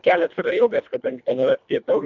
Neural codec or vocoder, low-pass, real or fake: codec, 24 kHz, 1.5 kbps, HILCodec; 7.2 kHz; fake